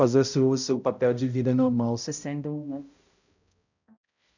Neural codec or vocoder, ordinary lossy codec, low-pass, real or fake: codec, 16 kHz, 0.5 kbps, X-Codec, HuBERT features, trained on balanced general audio; none; 7.2 kHz; fake